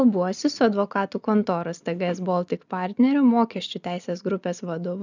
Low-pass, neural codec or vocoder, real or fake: 7.2 kHz; none; real